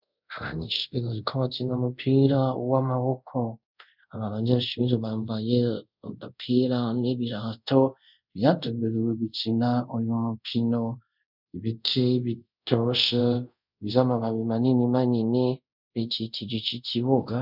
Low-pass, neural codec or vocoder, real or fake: 5.4 kHz; codec, 24 kHz, 0.5 kbps, DualCodec; fake